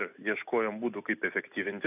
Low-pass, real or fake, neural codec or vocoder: 3.6 kHz; real; none